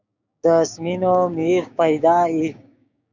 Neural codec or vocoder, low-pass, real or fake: codec, 16 kHz, 6 kbps, DAC; 7.2 kHz; fake